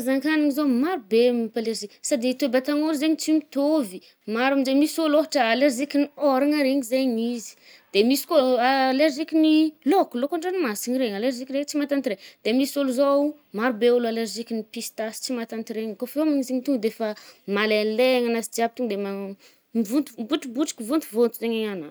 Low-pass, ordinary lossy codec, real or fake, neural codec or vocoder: none; none; real; none